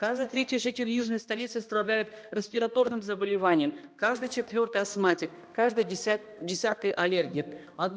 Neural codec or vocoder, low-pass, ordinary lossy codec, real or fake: codec, 16 kHz, 1 kbps, X-Codec, HuBERT features, trained on balanced general audio; none; none; fake